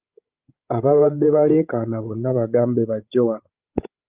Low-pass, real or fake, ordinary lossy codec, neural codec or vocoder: 3.6 kHz; fake; Opus, 32 kbps; codec, 16 kHz, 16 kbps, FreqCodec, larger model